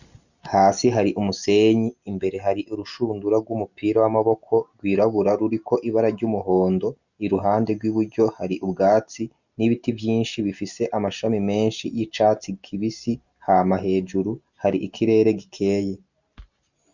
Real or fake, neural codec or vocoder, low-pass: real; none; 7.2 kHz